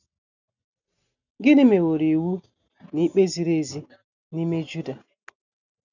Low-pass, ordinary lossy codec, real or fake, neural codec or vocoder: 7.2 kHz; none; real; none